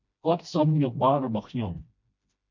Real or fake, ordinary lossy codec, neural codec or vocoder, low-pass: fake; MP3, 48 kbps; codec, 16 kHz, 1 kbps, FreqCodec, smaller model; 7.2 kHz